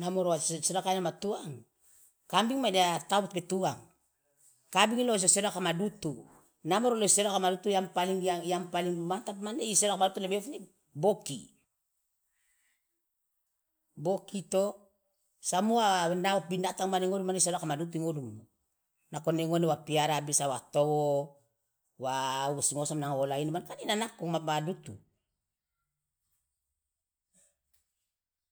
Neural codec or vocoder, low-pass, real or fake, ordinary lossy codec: none; none; real; none